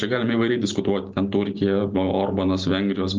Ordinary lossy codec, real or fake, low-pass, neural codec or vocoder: Opus, 32 kbps; real; 7.2 kHz; none